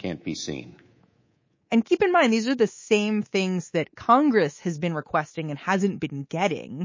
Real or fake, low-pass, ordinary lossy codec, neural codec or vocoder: fake; 7.2 kHz; MP3, 32 kbps; codec, 24 kHz, 3.1 kbps, DualCodec